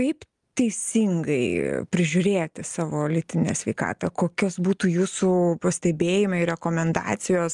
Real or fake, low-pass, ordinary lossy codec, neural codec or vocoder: real; 10.8 kHz; Opus, 32 kbps; none